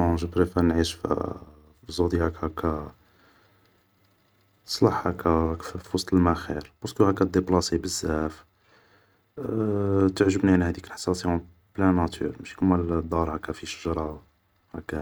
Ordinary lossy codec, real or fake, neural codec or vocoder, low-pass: none; real; none; none